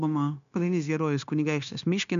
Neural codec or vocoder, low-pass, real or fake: codec, 16 kHz, 0.9 kbps, LongCat-Audio-Codec; 7.2 kHz; fake